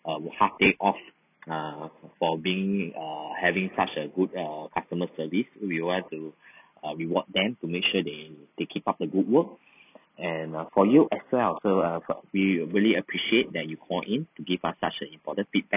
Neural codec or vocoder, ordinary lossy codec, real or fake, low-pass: none; none; real; 3.6 kHz